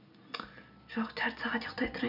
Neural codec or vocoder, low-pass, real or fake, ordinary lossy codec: none; 5.4 kHz; real; none